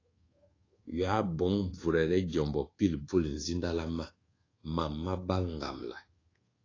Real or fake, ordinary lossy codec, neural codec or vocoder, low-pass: fake; AAC, 32 kbps; codec, 24 kHz, 1.2 kbps, DualCodec; 7.2 kHz